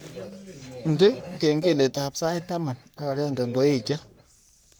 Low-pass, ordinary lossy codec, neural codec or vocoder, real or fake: none; none; codec, 44.1 kHz, 3.4 kbps, Pupu-Codec; fake